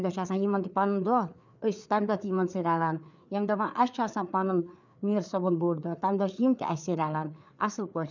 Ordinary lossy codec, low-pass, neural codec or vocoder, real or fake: none; 7.2 kHz; codec, 16 kHz, 4 kbps, FreqCodec, larger model; fake